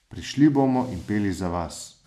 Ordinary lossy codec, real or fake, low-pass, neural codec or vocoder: none; real; 14.4 kHz; none